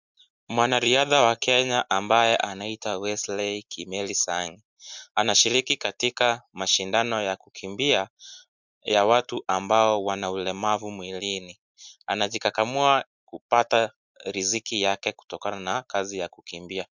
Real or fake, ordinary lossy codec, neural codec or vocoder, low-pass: real; MP3, 64 kbps; none; 7.2 kHz